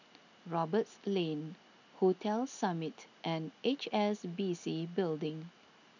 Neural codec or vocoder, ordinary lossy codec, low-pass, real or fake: none; none; 7.2 kHz; real